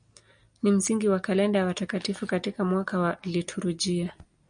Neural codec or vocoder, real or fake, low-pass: none; real; 9.9 kHz